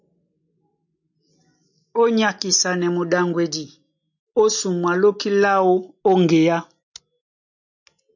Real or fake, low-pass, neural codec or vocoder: real; 7.2 kHz; none